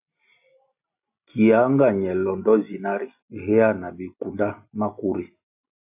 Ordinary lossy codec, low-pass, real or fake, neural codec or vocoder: AAC, 32 kbps; 3.6 kHz; real; none